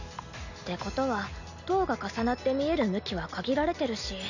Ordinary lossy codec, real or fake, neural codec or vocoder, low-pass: none; real; none; 7.2 kHz